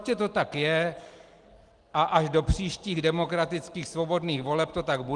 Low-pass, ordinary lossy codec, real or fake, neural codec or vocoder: 10.8 kHz; Opus, 24 kbps; real; none